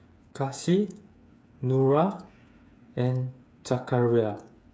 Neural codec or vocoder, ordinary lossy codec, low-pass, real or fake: codec, 16 kHz, 8 kbps, FreqCodec, smaller model; none; none; fake